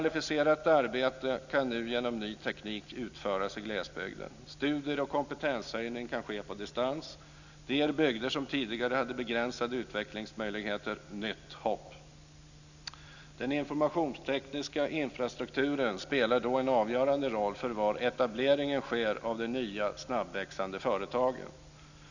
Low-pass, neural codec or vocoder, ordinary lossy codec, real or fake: 7.2 kHz; none; none; real